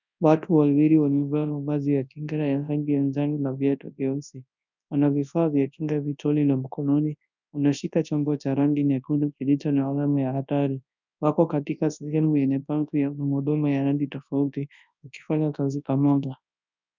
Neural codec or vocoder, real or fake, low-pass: codec, 24 kHz, 0.9 kbps, WavTokenizer, large speech release; fake; 7.2 kHz